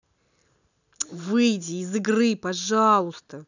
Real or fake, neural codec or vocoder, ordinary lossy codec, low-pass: real; none; none; 7.2 kHz